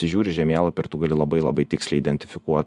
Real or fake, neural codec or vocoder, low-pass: fake; vocoder, 24 kHz, 100 mel bands, Vocos; 10.8 kHz